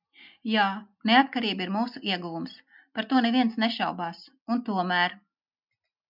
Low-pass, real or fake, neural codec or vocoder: 5.4 kHz; real; none